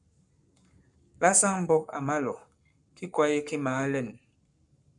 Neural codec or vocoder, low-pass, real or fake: codec, 44.1 kHz, 7.8 kbps, Pupu-Codec; 10.8 kHz; fake